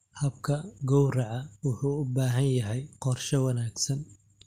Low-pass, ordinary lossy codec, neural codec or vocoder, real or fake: 14.4 kHz; Opus, 32 kbps; none; real